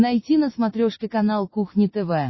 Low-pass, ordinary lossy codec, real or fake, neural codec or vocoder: 7.2 kHz; MP3, 24 kbps; real; none